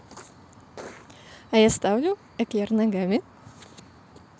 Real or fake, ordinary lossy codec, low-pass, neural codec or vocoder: real; none; none; none